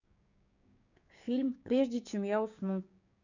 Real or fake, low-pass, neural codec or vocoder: fake; 7.2 kHz; codec, 16 kHz, 6 kbps, DAC